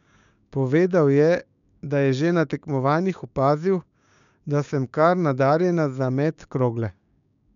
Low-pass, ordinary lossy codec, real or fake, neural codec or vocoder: 7.2 kHz; none; fake; codec, 16 kHz, 6 kbps, DAC